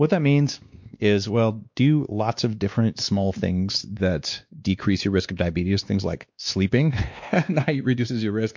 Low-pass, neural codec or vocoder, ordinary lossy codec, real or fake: 7.2 kHz; codec, 16 kHz, 2 kbps, X-Codec, WavLM features, trained on Multilingual LibriSpeech; MP3, 48 kbps; fake